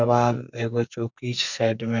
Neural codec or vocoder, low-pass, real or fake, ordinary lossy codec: codec, 44.1 kHz, 2.6 kbps, SNAC; 7.2 kHz; fake; none